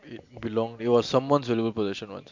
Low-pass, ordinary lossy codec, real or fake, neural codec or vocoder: 7.2 kHz; MP3, 64 kbps; real; none